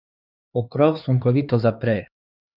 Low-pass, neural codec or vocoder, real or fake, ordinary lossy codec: 5.4 kHz; codec, 16 kHz, 2 kbps, X-Codec, HuBERT features, trained on LibriSpeech; fake; none